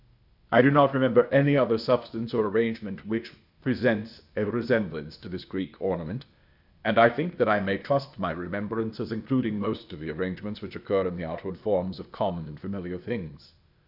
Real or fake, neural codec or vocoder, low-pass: fake; codec, 16 kHz, 0.8 kbps, ZipCodec; 5.4 kHz